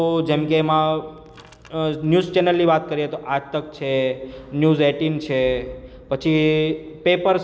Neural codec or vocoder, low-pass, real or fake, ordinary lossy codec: none; none; real; none